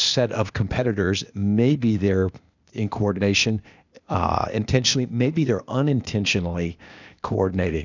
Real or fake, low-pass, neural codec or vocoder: fake; 7.2 kHz; codec, 16 kHz, 0.8 kbps, ZipCodec